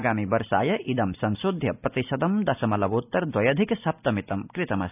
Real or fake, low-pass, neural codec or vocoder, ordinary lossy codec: real; 3.6 kHz; none; none